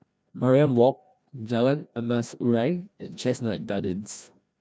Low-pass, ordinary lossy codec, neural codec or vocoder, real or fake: none; none; codec, 16 kHz, 1 kbps, FreqCodec, larger model; fake